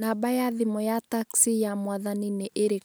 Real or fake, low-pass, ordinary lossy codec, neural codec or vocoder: real; none; none; none